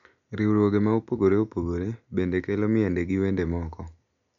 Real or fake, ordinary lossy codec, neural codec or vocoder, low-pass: real; none; none; 7.2 kHz